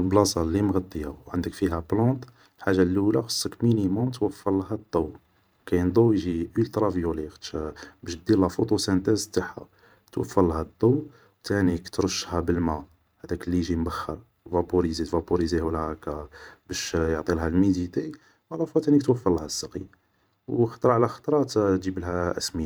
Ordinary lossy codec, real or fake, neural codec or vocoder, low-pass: none; fake; vocoder, 44.1 kHz, 128 mel bands every 512 samples, BigVGAN v2; none